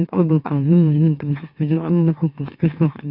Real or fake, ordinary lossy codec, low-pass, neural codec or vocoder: fake; none; 5.4 kHz; autoencoder, 44.1 kHz, a latent of 192 numbers a frame, MeloTTS